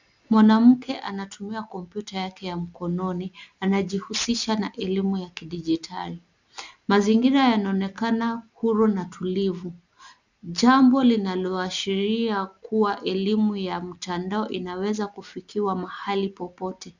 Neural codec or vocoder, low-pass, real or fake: none; 7.2 kHz; real